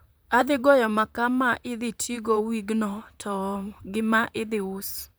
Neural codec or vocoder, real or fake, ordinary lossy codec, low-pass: vocoder, 44.1 kHz, 128 mel bands, Pupu-Vocoder; fake; none; none